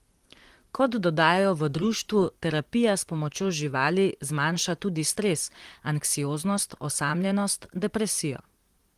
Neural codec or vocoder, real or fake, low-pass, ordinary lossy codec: vocoder, 44.1 kHz, 128 mel bands, Pupu-Vocoder; fake; 14.4 kHz; Opus, 24 kbps